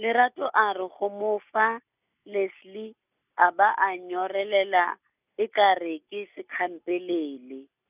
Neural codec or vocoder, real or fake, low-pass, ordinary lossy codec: none; real; 3.6 kHz; none